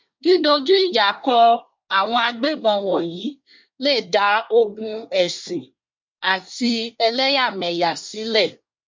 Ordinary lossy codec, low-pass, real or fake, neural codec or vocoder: MP3, 48 kbps; 7.2 kHz; fake; codec, 24 kHz, 1 kbps, SNAC